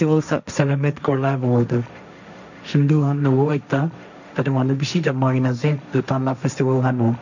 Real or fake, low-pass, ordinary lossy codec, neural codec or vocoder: fake; 7.2 kHz; none; codec, 16 kHz, 1.1 kbps, Voila-Tokenizer